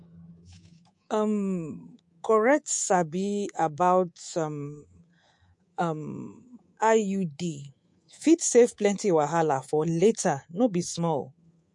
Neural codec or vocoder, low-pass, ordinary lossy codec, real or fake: codec, 24 kHz, 3.1 kbps, DualCodec; 10.8 kHz; MP3, 48 kbps; fake